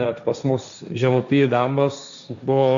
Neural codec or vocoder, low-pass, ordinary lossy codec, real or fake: codec, 16 kHz, 1.1 kbps, Voila-Tokenizer; 7.2 kHz; MP3, 96 kbps; fake